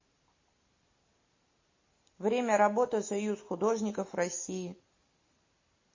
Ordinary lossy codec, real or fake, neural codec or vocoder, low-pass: MP3, 32 kbps; real; none; 7.2 kHz